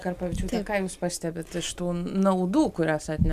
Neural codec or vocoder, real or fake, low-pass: none; real; 14.4 kHz